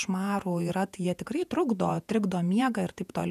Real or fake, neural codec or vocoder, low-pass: fake; vocoder, 48 kHz, 128 mel bands, Vocos; 14.4 kHz